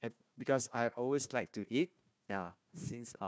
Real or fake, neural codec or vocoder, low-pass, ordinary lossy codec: fake; codec, 16 kHz, 1 kbps, FunCodec, trained on Chinese and English, 50 frames a second; none; none